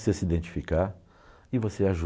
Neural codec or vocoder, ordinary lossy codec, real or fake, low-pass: none; none; real; none